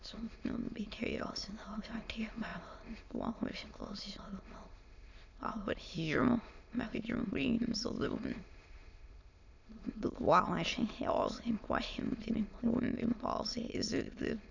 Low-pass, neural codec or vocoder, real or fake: 7.2 kHz; autoencoder, 22.05 kHz, a latent of 192 numbers a frame, VITS, trained on many speakers; fake